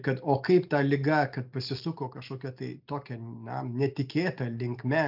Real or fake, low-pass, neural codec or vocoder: real; 5.4 kHz; none